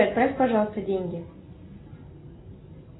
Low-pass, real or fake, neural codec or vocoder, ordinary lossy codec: 7.2 kHz; real; none; AAC, 16 kbps